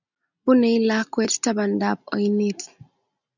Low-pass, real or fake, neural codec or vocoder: 7.2 kHz; real; none